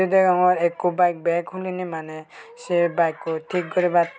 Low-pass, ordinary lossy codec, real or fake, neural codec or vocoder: none; none; real; none